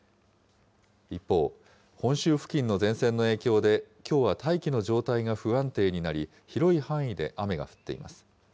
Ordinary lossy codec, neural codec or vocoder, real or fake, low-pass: none; none; real; none